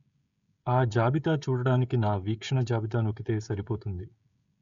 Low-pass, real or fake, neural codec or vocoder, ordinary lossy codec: 7.2 kHz; fake; codec, 16 kHz, 16 kbps, FreqCodec, smaller model; none